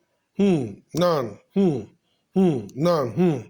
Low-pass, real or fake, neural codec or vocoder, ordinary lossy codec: 19.8 kHz; real; none; Opus, 64 kbps